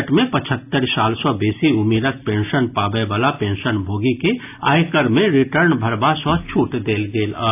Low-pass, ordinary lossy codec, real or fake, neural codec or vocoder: 3.6 kHz; AAC, 32 kbps; real; none